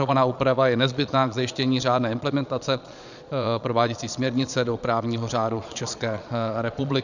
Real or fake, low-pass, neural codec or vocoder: fake; 7.2 kHz; vocoder, 22.05 kHz, 80 mel bands, Vocos